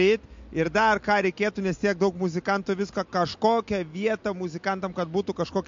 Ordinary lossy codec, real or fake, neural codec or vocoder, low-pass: MP3, 48 kbps; real; none; 7.2 kHz